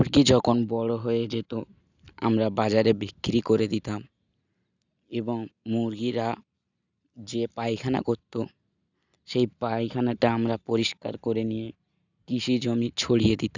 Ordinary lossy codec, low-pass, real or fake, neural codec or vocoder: none; 7.2 kHz; real; none